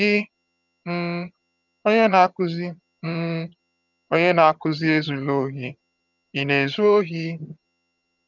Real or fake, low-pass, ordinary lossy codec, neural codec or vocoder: fake; 7.2 kHz; none; vocoder, 22.05 kHz, 80 mel bands, HiFi-GAN